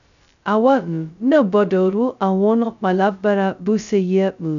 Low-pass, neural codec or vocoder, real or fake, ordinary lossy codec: 7.2 kHz; codec, 16 kHz, 0.2 kbps, FocalCodec; fake; none